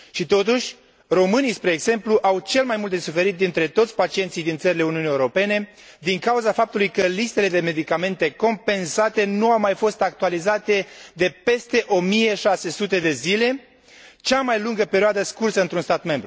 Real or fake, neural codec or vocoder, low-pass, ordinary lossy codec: real; none; none; none